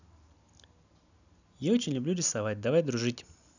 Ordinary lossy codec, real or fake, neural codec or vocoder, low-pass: none; real; none; 7.2 kHz